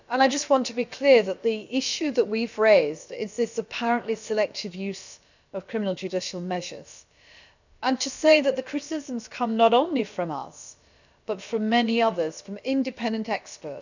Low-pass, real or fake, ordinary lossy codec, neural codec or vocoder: 7.2 kHz; fake; none; codec, 16 kHz, about 1 kbps, DyCAST, with the encoder's durations